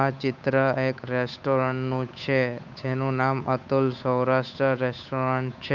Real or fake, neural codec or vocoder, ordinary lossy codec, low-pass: fake; codec, 16 kHz, 8 kbps, FunCodec, trained on Chinese and English, 25 frames a second; none; 7.2 kHz